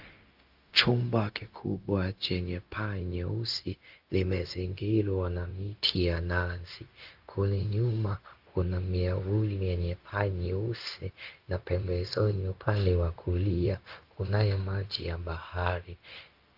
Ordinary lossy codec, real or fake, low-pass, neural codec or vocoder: Opus, 32 kbps; fake; 5.4 kHz; codec, 16 kHz, 0.4 kbps, LongCat-Audio-Codec